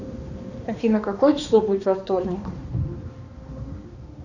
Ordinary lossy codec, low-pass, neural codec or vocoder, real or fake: Opus, 64 kbps; 7.2 kHz; codec, 16 kHz, 2 kbps, X-Codec, HuBERT features, trained on balanced general audio; fake